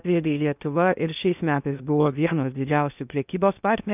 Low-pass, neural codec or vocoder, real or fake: 3.6 kHz; codec, 16 kHz in and 24 kHz out, 0.6 kbps, FocalCodec, streaming, 2048 codes; fake